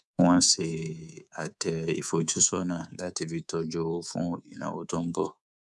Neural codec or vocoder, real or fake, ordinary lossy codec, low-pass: codec, 24 kHz, 3.1 kbps, DualCodec; fake; none; none